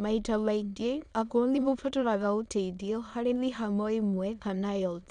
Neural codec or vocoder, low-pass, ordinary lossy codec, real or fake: autoencoder, 22.05 kHz, a latent of 192 numbers a frame, VITS, trained on many speakers; 9.9 kHz; none; fake